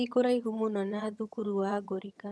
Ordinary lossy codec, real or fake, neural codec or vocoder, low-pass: none; fake; vocoder, 22.05 kHz, 80 mel bands, HiFi-GAN; none